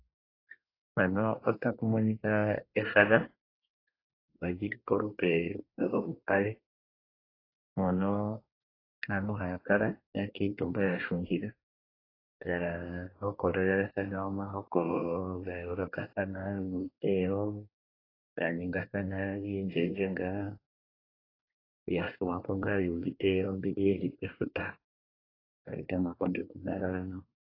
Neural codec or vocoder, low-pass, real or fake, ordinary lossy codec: codec, 24 kHz, 1 kbps, SNAC; 5.4 kHz; fake; AAC, 24 kbps